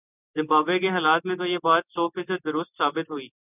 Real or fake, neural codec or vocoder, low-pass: real; none; 3.6 kHz